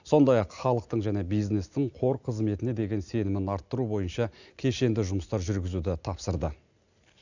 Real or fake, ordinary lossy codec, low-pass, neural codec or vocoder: real; none; 7.2 kHz; none